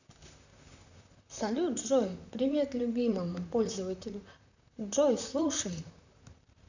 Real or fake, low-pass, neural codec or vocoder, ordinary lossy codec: fake; 7.2 kHz; vocoder, 44.1 kHz, 128 mel bands, Pupu-Vocoder; none